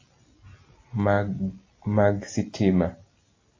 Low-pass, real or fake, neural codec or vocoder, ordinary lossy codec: 7.2 kHz; real; none; AAC, 32 kbps